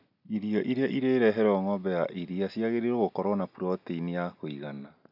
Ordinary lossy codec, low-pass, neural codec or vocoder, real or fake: none; 5.4 kHz; none; real